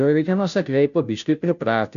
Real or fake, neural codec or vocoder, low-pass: fake; codec, 16 kHz, 0.5 kbps, FunCodec, trained on Chinese and English, 25 frames a second; 7.2 kHz